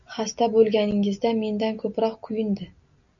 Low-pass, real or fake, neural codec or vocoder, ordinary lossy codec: 7.2 kHz; real; none; MP3, 96 kbps